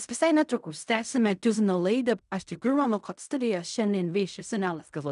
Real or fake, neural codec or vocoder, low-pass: fake; codec, 16 kHz in and 24 kHz out, 0.4 kbps, LongCat-Audio-Codec, fine tuned four codebook decoder; 10.8 kHz